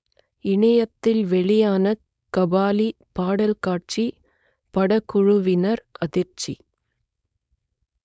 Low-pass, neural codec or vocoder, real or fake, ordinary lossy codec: none; codec, 16 kHz, 4.8 kbps, FACodec; fake; none